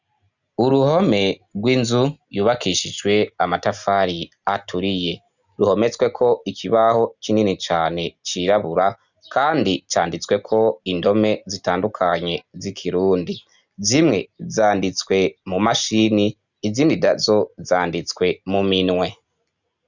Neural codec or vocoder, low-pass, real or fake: none; 7.2 kHz; real